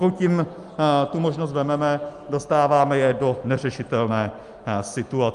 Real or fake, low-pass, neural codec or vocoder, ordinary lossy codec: real; 10.8 kHz; none; Opus, 32 kbps